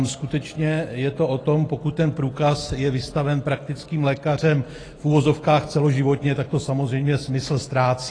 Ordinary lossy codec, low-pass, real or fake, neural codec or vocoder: AAC, 32 kbps; 9.9 kHz; real; none